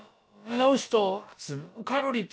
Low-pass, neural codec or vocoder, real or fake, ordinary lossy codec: none; codec, 16 kHz, about 1 kbps, DyCAST, with the encoder's durations; fake; none